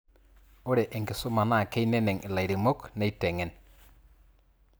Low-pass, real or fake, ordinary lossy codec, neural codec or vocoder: none; real; none; none